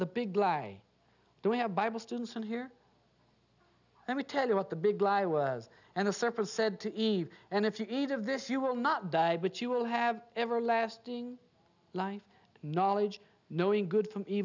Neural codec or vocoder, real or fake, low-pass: none; real; 7.2 kHz